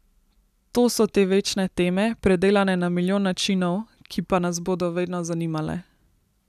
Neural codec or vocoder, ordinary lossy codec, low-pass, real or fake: none; none; 14.4 kHz; real